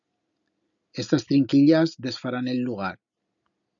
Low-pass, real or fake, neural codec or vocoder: 7.2 kHz; real; none